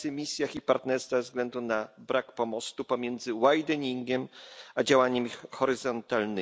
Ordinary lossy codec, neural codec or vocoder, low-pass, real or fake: none; none; none; real